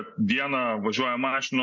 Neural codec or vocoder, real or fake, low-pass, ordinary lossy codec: none; real; 7.2 kHz; MP3, 48 kbps